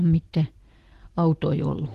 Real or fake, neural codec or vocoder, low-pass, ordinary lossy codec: real; none; 14.4 kHz; Opus, 16 kbps